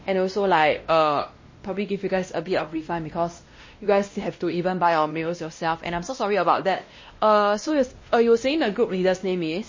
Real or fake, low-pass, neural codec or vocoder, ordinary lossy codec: fake; 7.2 kHz; codec, 16 kHz, 1 kbps, X-Codec, WavLM features, trained on Multilingual LibriSpeech; MP3, 32 kbps